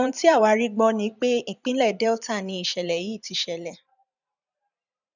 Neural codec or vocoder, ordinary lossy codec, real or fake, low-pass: vocoder, 44.1 kHz, 128 mel bands every 512 samples, BigVGAN v2; none; fake; 7.2 kHz